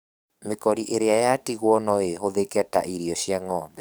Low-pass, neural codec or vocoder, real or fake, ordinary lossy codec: none; codec, 44.1 kHz, 7.8 kbps, DAC; fake; none